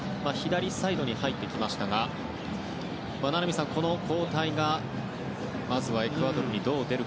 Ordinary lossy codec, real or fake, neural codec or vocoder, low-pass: none; real; none; none